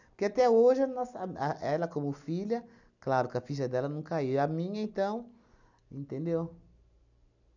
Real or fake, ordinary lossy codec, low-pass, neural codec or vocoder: real; none; 7.2 kHz; none